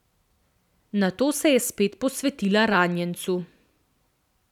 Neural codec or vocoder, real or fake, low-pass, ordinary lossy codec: vocoder, 44.1 kHz, 128 mel bands every 512 samples, BigVGAN v2; fake; 19.8 kHz; none